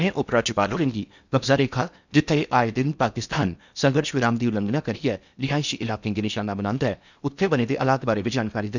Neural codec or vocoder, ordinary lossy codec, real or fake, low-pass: codec, 16 kHz in and 24 kHz out, 0.8 kbps, FocalCodec, streaming, 65536 codes; none; fake; 7.2 kHz